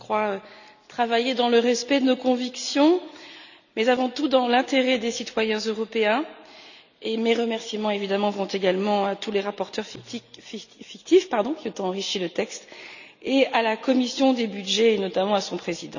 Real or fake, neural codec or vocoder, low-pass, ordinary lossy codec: real; none; 7.2 kHz; none